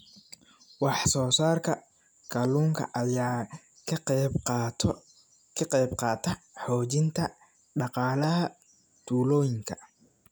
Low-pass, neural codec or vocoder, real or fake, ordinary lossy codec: none; none; real; none